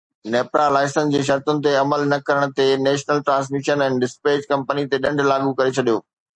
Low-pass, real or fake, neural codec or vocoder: 9.9 kHz; real; none